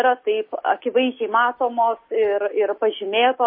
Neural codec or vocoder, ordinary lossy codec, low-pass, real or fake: none; MP3, 24 kbps; 5.4 kHz; real